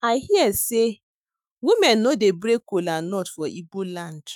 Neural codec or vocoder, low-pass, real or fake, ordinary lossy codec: autoencoder, 48 kHz, 128 numbers a frame, DAC-VAE, trained on Japanese speech; 19.8 kHz; fake; none